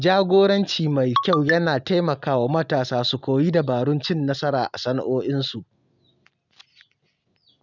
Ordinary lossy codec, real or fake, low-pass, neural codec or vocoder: none; real; 7.2 kHz; none